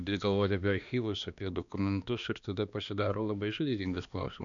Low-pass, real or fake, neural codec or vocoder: 7.2 kHz; fake; codec, 16 kHz, 2 kbps, X-Codec, HuBERT features, trained on balanced general audio